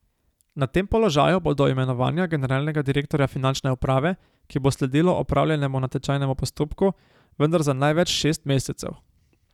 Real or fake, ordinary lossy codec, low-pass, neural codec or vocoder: real; none; 19.8 kHz; none